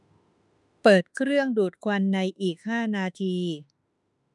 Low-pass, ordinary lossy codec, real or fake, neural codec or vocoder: 10.8 kHz; none; fake; autoencoder, 48 kHz, 32 numbers a frame, DAC-VAE, trained on Japanese speech